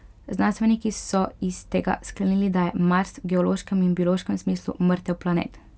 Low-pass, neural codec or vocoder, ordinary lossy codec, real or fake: none; none; none; real